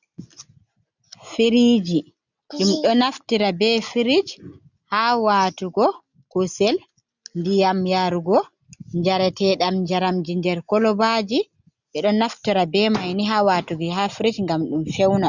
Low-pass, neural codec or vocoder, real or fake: 7.2 kHz; none; real